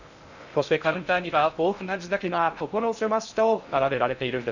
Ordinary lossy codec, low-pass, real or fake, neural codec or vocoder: none; 7.2 kHz; fake; codec, 16 kHz in and 24 kHz out, 0.6 kbps, FocalCodec, streaming, 2048 codes